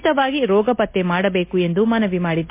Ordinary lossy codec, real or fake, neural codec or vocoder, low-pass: MP3, 24 kbps; real; none; 3.6 kHz